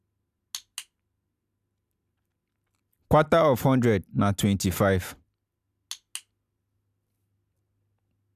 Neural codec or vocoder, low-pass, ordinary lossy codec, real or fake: none; 14.4 kHz; none; real